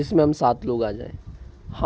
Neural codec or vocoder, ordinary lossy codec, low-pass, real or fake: none; none; none; real